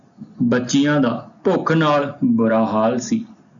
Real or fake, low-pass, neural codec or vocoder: real; 7.2 kHz; none